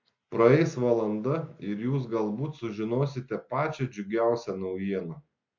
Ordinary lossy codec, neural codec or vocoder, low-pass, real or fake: MP3, 48 kbps; none; 7.2 kHz; real